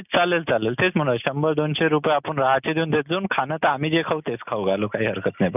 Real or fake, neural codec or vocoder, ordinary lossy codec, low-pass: real; none; none; 3.6 kHz